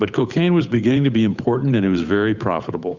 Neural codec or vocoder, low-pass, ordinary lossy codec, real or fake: codec, 16 kHz, 8 kbps, FunCodec, trained on Chinese and English, 25 frames a second; 7.2 kHz; Opus, 64 kbps; fake